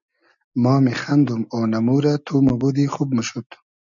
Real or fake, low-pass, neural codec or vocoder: real; 7.2 kHz; none